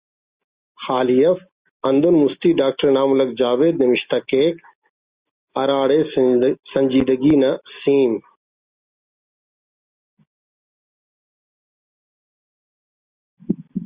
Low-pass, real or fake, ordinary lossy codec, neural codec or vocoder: 3.6 kHz; real; Opus, 64 kbps; none